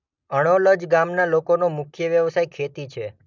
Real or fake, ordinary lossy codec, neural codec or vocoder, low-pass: real; none; none; 7.2 kHz